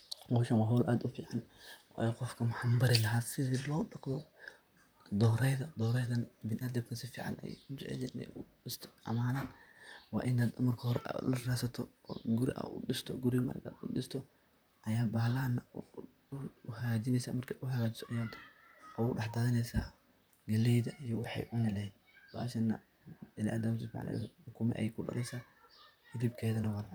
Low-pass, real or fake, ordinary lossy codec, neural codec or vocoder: none; fake; none; vocoder, 44.1 kHz, 128 mel bands, Pupu-Vocoder